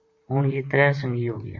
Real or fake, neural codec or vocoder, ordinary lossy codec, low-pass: fake; vocoder, 44.1 kHz, 128 mel bands, Pupu-Vocoder; MP3, 48 kbps; 7.2 kHz